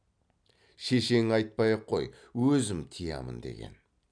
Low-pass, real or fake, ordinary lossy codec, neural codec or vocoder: 9.9 kHz; real; none; none